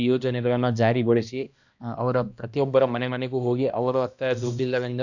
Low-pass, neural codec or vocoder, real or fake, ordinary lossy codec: 7.2 kHz; codec, 16 kHz, 1 kbps, X-Codec, HuBERT features, trained on balanced general audio; fake; none